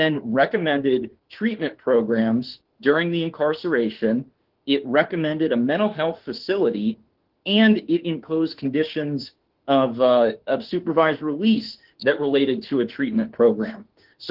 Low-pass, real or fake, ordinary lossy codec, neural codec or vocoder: 5.4 kHz; fake; Opus, 16 kbps; autoencoder, 48 kHz, 32 numbers a frame, DAC-VAE, trained on Japanese speech